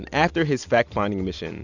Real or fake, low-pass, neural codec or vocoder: real; 7.2 kHz; none